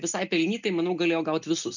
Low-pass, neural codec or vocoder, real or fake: 7.2 kHz; none; real